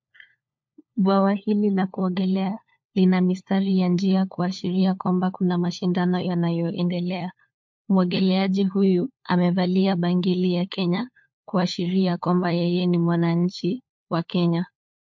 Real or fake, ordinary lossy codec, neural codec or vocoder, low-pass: fake; MP3, 48 kbps; codec, 16 kHz, 4 kbps, FunCodec, trained on LibriTTS, 50 frames a second; 7.2 kHz